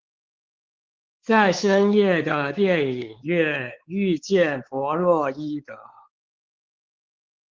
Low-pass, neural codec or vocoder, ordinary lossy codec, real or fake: 7.2 kHz; codec, 16 kHz, 4 kbps, X-Codec, WavLM features, trained on Multilingual LibriSpeech; Opus, 16 kbps; fake